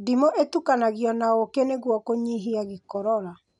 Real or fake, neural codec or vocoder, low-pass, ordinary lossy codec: real; none; 10.8 kHz; none